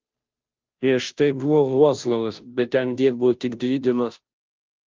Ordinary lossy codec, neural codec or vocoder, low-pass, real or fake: Opus, 24 kbps; codec, 16 kHz, 0.5 kbps, FunCodec, trained on Chinese and English, 25 frames a second; 7.2 kHz; fake